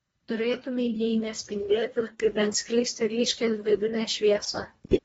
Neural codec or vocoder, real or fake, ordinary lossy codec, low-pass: codec, 24 kHz, 1.5 kbps, HILCodec; fake; AAC, 24 kbps; 10.8 kHz